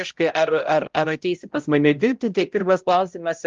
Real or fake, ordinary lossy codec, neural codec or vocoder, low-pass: fake; Opus, 24 kbps; codec, 16 kHz, 0.5 kbps, X-Codec, HuBERT features, trained on balanced general audio; 7.2 kHz